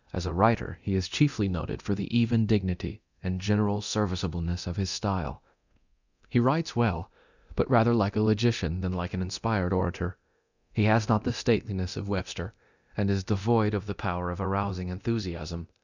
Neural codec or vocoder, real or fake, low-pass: codec, 24 kHz, 0.9 kbps, DualCodec; fake; 7.2 kHz